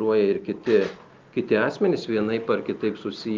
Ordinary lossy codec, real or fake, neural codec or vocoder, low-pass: Opus, 24 kbps; real; none; 7.2 kHz